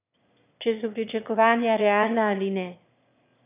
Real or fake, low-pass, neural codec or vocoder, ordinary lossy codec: fake; 3.6 kHz; autoencoder, 22.05 kHz, a latent of 192 numbers a frame, VITS, trained on one speaker; none